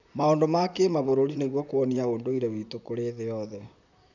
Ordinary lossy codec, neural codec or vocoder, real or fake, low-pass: none; vocoder, 44.1 kHz, 128 mel bands, Pupu-Vocoder; fake; 7.2 kHz